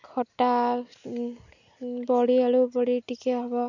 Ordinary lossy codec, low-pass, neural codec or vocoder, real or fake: none; 7.2 kHz; none; real